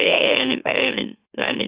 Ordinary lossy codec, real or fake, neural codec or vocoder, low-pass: Opus, 64 kbps; fake; autoencoder, 44.1 kHz, a latent of 192 numbers a frame, MeloTTS; 3.6 kHz